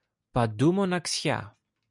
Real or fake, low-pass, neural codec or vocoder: real; 10.8 kHz; none